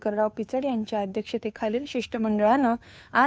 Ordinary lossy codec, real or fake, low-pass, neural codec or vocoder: none; fake; none; codec, 16 kHz, 2 kbps, FunCodec, trained on Chinese and English, 25 frames a second